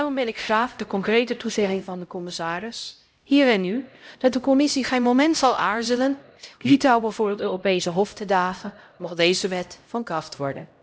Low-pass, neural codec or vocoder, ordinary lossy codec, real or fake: none; codec, 16 kHz, 0.5 kbps, X-Codec, HuBERT features, trained on LibriSpeech; none; fake